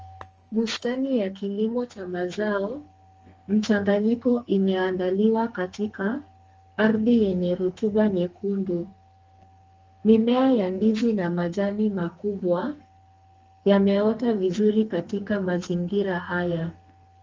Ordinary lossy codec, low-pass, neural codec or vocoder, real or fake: Opus, 24 kbps; 7.2 kHz; codec, 32 kHz, 1.9 kbps, SNAC; fake